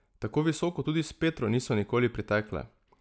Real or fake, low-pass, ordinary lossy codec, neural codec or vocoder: real; none; none; none